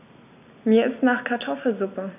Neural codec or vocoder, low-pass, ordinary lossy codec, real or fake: none; 3.6 kHz; none; real